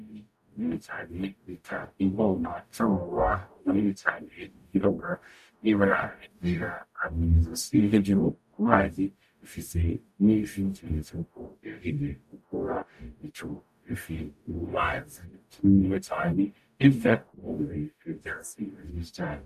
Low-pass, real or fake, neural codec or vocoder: 14.4 kHz; fake; codec, 44.1 kHz, 0.9 kbps, DAC